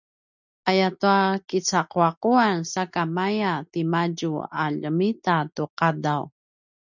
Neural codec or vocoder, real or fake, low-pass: none; real; 7.2 kHz